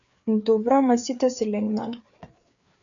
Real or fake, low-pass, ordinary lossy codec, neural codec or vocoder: fake; 7.2 kHz; MP3, 96 kbps; codec, 16 kHz, 4 kbps, FreqCodec, larger model